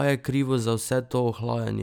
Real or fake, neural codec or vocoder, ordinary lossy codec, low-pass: real; none; none; none